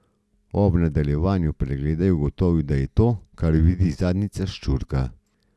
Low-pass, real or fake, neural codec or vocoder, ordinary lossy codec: none; real; none; none